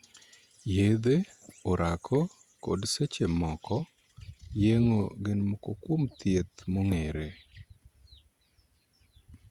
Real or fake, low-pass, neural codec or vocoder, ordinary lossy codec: fake; 19.8 kHz; vocoder, 48 kHz, 128 mel bands, Vocos; Opus, 64 kbps